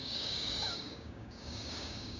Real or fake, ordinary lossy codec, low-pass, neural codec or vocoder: fake; none; 7.2 kHz; codec, 16 kHz, 6 kbps, DAC